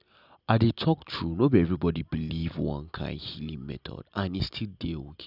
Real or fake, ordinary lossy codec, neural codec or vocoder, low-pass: real; none; none; 5.4 kHz